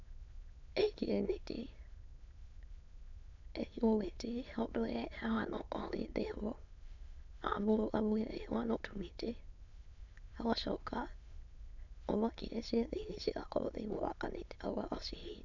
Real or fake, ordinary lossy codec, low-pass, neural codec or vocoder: fake; none; 7.2 kHz; autoencoder, 22.05 kHz, a latent of 192 numbers a frame, VITS, trained on many speakers